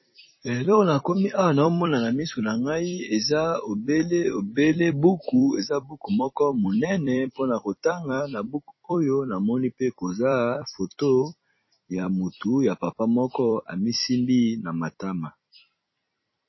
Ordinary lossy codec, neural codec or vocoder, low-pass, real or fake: MP3, 24 kbps; vocoder, 44.1 kHz, 128 mel bands every 256 samples, BigVGAN v2; 7.2 kHz; fake